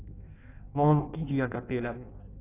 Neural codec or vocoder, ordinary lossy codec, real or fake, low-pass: codec, 16 kHz in and 24 kHz out, 0.6 kbps, FireRedTTS-2 codec; AAC, 24 kbps; fake; 3.6 kHz